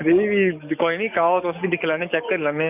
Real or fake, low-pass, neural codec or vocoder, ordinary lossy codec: fake; 3.6 kHz; codec, 44.1 kHz, 7.8 kbps, DAC; none